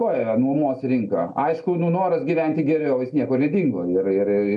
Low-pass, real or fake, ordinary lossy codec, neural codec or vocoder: 10.8 kHz; real; MP3, 64 kbps; none